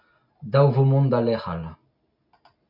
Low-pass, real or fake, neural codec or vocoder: 5.4 kHz; real; none